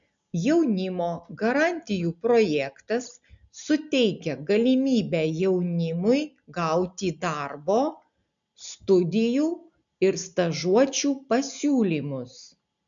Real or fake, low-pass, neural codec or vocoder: real; 7.2 kHz; none